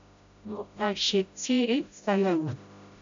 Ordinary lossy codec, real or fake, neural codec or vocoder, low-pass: AAC, 64 kbps; fake; codec, 16 kHz, 0.5 kbps, FreqCodec, smaller model; 7.2 kHz